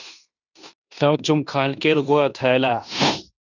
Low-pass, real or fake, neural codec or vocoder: 7.2 kHz; fake; codec, 16 kHz in and 24 kHz out, 0.9 kbps, LongCat-Audio-Codec, fine tuned four codebook decoder